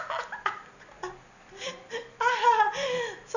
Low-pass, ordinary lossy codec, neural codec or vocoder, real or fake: 7.2 kHz; none; none; real